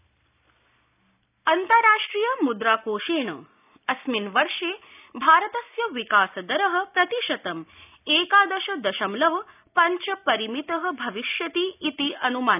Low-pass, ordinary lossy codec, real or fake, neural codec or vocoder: 3.6 kHz; none; real; none